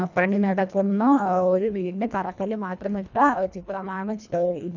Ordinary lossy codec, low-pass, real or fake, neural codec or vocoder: none; 7.2 kHz; fake; codec, 24 kHz, 1.5 kbps, HILCodec